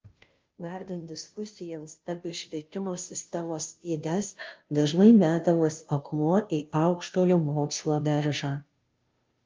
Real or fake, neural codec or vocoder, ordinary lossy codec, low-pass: fake; codec, 16 kHz, 0.5 kbps, FunCodec, trained on Chinese and English, 25 frames a second; Opus, 24 kbps; 7.2 kHz